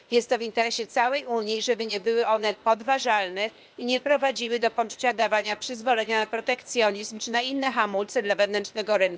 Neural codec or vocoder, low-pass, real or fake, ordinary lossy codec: codec, 16 kHz, 0.8 kbps, ZipCodec; none; fake; none